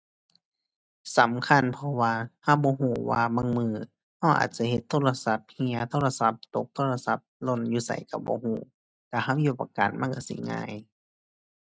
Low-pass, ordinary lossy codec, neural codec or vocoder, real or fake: none; none; none; real